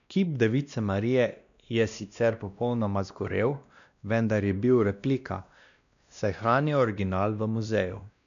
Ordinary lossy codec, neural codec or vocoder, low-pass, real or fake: none; codec, 16 kHz, 1 kbps, X-Codec, WavLM features, trained on Multilingual LibriSpeech; 7.2 kHz; fake